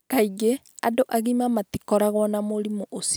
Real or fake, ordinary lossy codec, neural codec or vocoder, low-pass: real; none; none; none